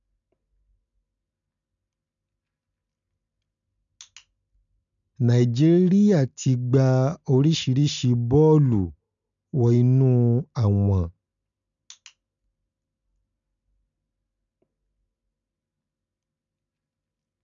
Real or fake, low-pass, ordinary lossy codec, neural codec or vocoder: real; 7.2 kHz; none; none